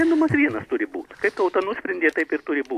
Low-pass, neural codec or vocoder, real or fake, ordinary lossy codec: 14.4 kHz; none; real; AAC, 64 kbps